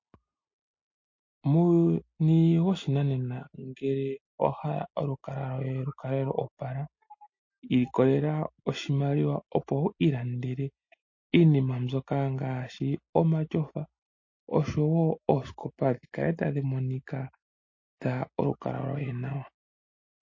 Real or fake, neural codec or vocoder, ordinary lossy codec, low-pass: real; none; MP3, 32 kbps; 7.2 kHz